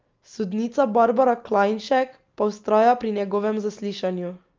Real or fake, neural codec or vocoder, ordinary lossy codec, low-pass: real; none; Opus, 24 kbps; 7.2 kHz